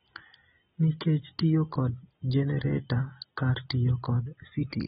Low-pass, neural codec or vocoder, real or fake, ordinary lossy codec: 9.9 kHz; none; real; AAC, 16 kbps